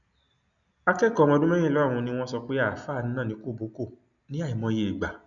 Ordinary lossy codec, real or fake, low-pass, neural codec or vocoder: none; real; 7.2 kHz; none